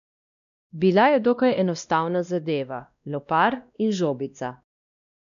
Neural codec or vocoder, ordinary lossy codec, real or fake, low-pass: codec, 16 kHz, 1 kbps, X-Codec, WavLM features, trained on Multilingual LibriSpeech; none; fake; 7.2 kHz